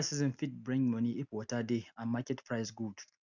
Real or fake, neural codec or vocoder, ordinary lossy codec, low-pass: real; none; none; 7.2 kHz